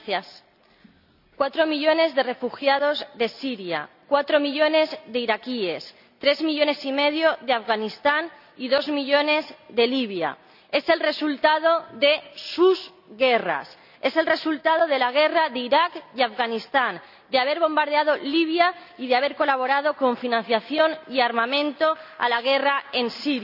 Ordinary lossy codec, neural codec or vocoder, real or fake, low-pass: none; none; real; 5.4 kHz